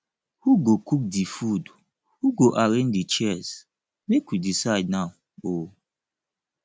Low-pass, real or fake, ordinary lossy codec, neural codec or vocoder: none; real; none; none